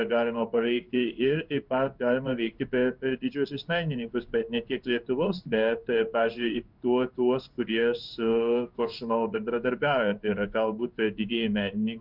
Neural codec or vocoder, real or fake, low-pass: codec, 16 kHz in and 24 kHz out, 1 kbps, XY-Tokenizer; fake; 5.4 kHz